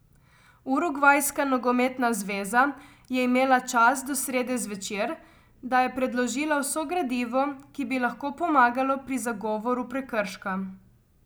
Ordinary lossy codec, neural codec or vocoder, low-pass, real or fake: none; none; none; real